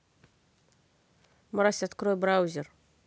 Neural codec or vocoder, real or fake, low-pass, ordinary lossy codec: none; real; none; none